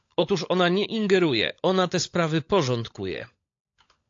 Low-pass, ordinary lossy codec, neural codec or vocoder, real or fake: 7.2 kHz; AAC, 48 kbps; codec, 16 kHz, 16 kbps, FunCodec, trained on LibriTTS, 50 frames a second; fake